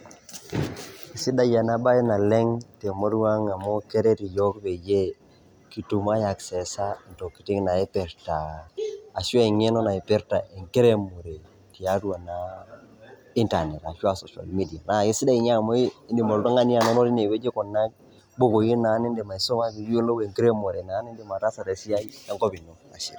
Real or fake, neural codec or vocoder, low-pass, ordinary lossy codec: real; none; none; none